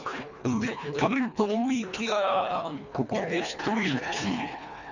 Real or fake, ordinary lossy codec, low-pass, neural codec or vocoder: fake; none; 7.2 kHz; codec, 24 kHz, 1.5 kbps, HILCodec